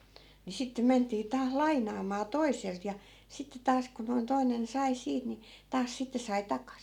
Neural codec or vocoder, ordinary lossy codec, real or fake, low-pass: none; none; real; 19.8 kHz